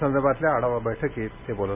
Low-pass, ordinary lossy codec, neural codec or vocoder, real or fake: 3.6 kHz; none; none; real